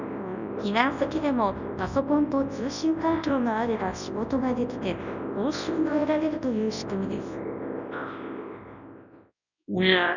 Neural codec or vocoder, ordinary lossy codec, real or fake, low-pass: codec, 24 kHz, 0.9 kbps, WavTokenizer, large speech release; none; fake; 7.2 kHz